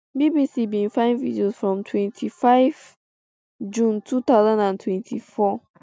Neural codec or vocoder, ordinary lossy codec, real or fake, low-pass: none; none; real; none